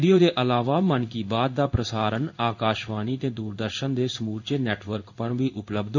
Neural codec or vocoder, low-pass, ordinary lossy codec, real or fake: codec, 16 kHz in and 24 kHz out, 1 kbps, XY-Tokenizer; 7.2 kHz; none; fake